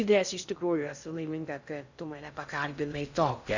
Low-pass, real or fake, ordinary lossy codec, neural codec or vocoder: 7.2 kHz; fake; Opus, 64 kbps; codec, 16 kHz in and 24 kHz out, 0.6 kbps, FocalCodec, streaming, 4096 codes